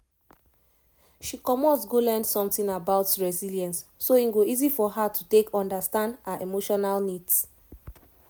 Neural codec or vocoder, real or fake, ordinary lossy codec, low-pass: none; real; none; none